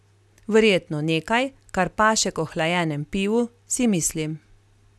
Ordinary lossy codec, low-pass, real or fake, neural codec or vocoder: none; none; real; none